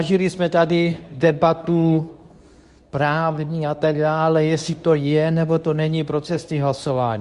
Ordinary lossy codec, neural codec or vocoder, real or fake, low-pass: Opus, 64 kbps; codec, 24 kHz, 0.9 kbps, WavTokenizer, medium speech release version 2; fake; 10.8 kHz